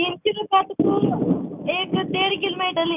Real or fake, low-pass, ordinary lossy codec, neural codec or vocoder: real; 3.6 kHz; none; none